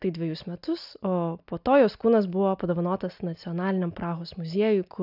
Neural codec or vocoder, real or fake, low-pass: none; real; 5.4 kHz